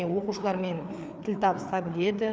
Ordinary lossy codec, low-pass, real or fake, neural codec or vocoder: none; none; fake; codec, 16 kHz, 4 kbps, FreqCodec, larger model